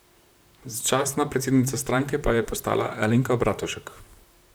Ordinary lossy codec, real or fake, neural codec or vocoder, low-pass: none; fake; vocoder, 44.1 kHz, 128 mel bands, Pupu-Vocoder; none